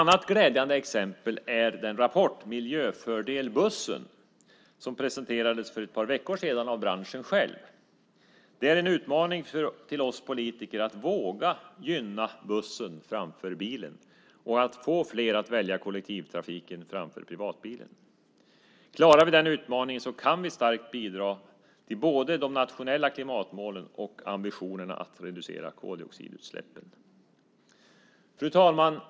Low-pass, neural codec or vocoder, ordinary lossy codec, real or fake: none; none; none; real